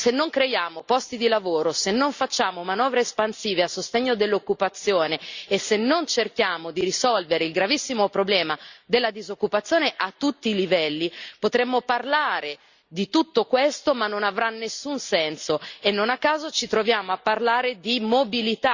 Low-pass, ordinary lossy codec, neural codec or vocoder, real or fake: 7.2 kHz; Opus, 64 kbps; none; real